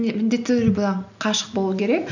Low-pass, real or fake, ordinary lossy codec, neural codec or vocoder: 7.2 kHz; real; none; none